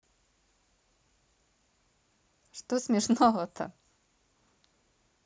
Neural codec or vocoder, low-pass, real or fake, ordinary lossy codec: none; none; real; none